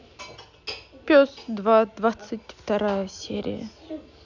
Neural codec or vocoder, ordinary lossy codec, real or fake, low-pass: none; none; real; 7.2 kHz